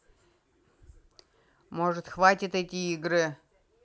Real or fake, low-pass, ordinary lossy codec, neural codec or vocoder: real; none; none; none